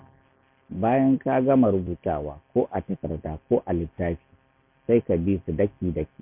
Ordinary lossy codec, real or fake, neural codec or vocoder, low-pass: MP3, 32 kbps; real; none; 3.6 kHz